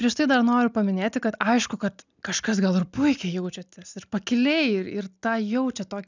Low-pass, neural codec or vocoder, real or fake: 7.2 kHz; none; real